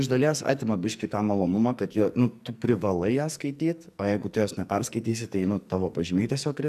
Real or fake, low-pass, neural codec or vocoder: fake; 14.4 kHz; codec, 44.1 kHz, 2.6 kbps, SNAC